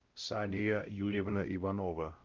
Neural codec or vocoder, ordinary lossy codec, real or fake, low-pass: codec, 16 kHz, 0.5 kbps, X-Codec, WavLM features, trained on Multilingual LibriSpeech; Opus, 24 kbps; fake; 7.2 kHz